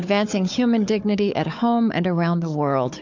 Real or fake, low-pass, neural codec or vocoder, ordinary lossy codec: fake; 7.2 kHz; codec, 16 kHz, 4 kbps, FunCodec, trained on Chinese and English, 50 frames a second; MP3, 64 kbps